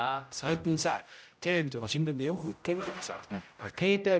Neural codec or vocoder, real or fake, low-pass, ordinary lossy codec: codec, 16 kHz, 0.5 kbps, X-Codec, HuBERT features, trained on general audio; fake; none; none